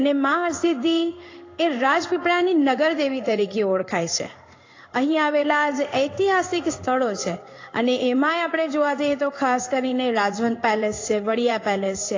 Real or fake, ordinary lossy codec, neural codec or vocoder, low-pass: fake; AAC, 32 kbps; codec, 16 kHz in and 24 kHz out, 1 kbps, XY-Tokenizer; 7.2 kHz